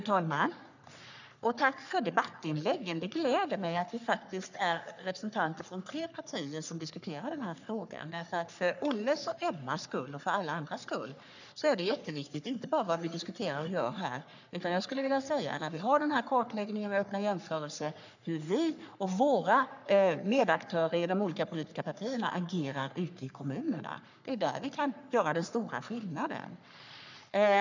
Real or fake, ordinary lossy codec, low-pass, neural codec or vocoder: fake; none; 7.2 kHz; codec, 44.1 kHz, 3.4 kbps, Pupu-Codec